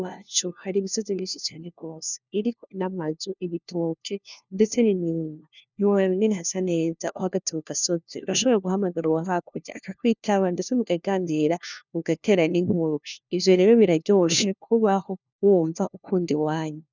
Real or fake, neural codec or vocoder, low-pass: fake; codec, 16 kHz, 1 kbps, FunCodec, trained on LibriTTS, 50 frames a second; 7.2 kHz